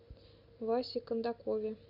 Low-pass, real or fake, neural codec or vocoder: 5.4 kHz; real; none